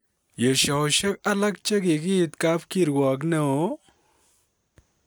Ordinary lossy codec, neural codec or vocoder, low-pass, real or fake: none; none; none; real